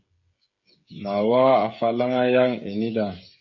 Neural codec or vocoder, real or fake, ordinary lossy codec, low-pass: codec, 16 kHz, 8 kbps, FreqCodec, smaller model; fake; MP3, 32 kbps; 7.2 kHz